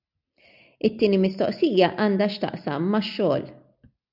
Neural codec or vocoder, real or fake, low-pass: none; real; 5.4 kHz